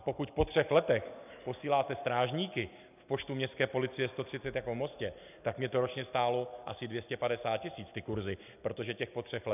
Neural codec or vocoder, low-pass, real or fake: none; 3.6 kHz; real